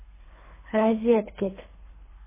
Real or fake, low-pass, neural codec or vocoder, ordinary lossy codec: fake; 3.6 kHz; codec, 24 kHz, 3 kbps, HILCodec; MP3, 16 kbps